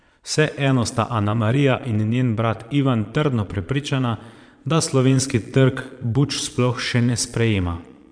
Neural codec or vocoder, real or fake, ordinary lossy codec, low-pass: vocoder, 22.05 kHz, 80 mel bands, Vocos; fake; none; 9.9 kHz